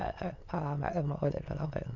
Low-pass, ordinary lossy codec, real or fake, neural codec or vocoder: 7.2 kHz; MP3, 64 kbps; fake; autoencoder, 22.05 kHz, a latent of 192 numbers a frame, VITS, trained on many speakers